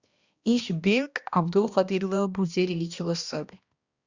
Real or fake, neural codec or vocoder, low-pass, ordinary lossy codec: fake; codec, 16 kHz, 1 kbps, X-Codec, HuBERT features, trained on balanced general audio; 7.2 kHz; Opus, 64 kbps